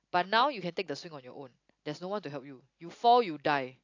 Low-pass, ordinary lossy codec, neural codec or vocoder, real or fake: 7.2 kHz; AAC, 48 kbps; none; real